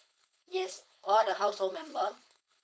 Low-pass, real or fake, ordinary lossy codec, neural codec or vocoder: none; fake; none; codec, 16 kHz, 4.8 kbps, FACodec